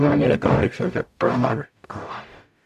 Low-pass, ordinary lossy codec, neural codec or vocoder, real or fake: 14.4 kHz; AAC, 96 kbps; codec, 44.1 kHz, 0.9 kbps, DAC; fake